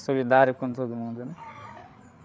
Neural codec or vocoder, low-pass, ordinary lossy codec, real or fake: codec, 16 kHz, 8 kbps, FreqCodec, larger model; none; none; fake